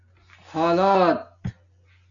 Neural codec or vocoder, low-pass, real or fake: none; 7.2 kHz; real